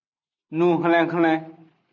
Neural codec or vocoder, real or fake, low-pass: none; real; 7.2 kHz